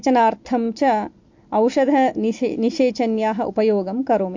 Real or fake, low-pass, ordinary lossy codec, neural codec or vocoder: real; 7.2 kHz; MP3, 48 kbps; none